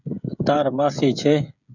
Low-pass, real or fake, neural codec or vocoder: 7.2 kHz; fake; codec, 16 kHz, 16 kbps, FreqCodec, smaller model